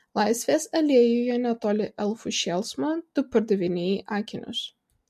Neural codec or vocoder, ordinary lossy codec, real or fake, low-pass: none; MP3, 64 kbps; real; 14.4 kHz